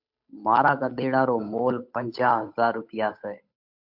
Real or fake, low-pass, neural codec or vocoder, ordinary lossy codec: fake; 5.4 kHz; codec, 16 kHz, 8 kbps, FunCodec, trained on Chinese and English, 25 frames a second; MP3, 48 kbps